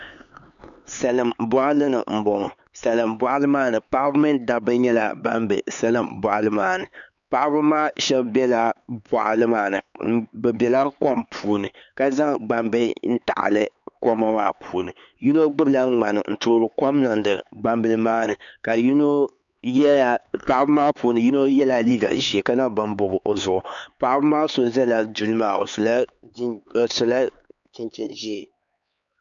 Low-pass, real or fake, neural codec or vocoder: 7.2 kHz; fake; codec, 16 kHz, 4 kbps, X-Codec, HuBERT features, trained on LibriSpeech